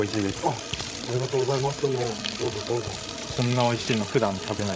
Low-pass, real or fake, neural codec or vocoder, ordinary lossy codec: none; fake; codec, 16 kHz, 16 kbps, FreqCodec, larger model; none